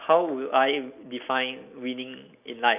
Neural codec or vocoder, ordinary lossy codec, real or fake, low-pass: none; none; real; 3.6 kHz